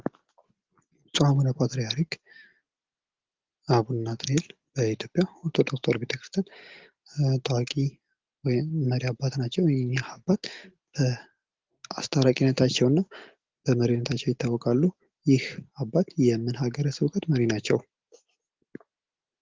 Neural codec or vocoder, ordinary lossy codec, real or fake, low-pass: none; Opus, 16 kbps; real; 7.2 kHz